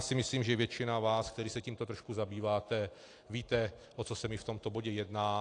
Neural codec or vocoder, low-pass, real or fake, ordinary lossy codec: none; 9.9 kHz; real; AAC, 48 kbps